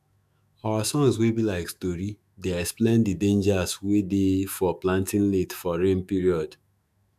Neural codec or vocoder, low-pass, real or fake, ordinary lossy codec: autoencoder, 48 kHz, 128 numbers a frame, DAC-VAE, trained on Japanese speech; 14.4 kHz; fake; none